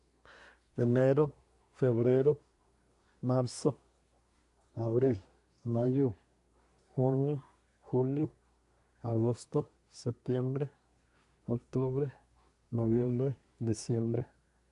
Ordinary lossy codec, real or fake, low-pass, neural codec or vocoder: AAC, 64 kbps; fake; 10.8 kHz; codec, 24 kHz, 1 kbps, SNAC